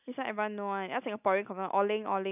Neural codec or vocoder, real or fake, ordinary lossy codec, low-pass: none; real; none; 3.6 kHz